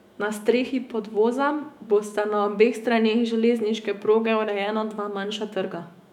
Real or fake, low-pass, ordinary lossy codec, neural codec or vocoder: fake; 19.8 kHz; none; autoencoder, 48 kHz, 128 numbers a frame, DAC-VAE, trained on Japanese speech